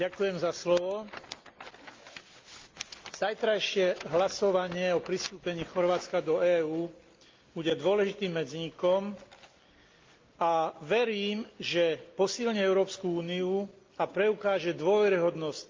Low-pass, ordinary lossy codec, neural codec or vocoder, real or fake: 7.2 kHz; Opus, 24 kbps; none; real